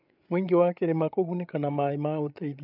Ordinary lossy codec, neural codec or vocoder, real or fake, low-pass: none; codec, 16 kHz, 8 kbps, FreqCodec, larger model; fake; 5.4 kHz